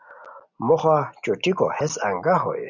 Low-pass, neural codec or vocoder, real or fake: 7.2 kHz; none; real